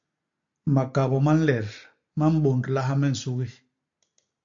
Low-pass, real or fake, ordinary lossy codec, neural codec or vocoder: 7.2 kHz; real; MP3, 48 kbps; none